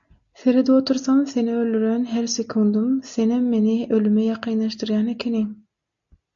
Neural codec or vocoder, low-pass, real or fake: none; 7.2 kHz; real